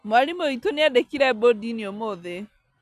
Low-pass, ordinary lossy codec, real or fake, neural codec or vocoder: 14.4 kHz; none; real; none